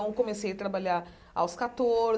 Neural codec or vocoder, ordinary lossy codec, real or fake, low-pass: none; none; real; none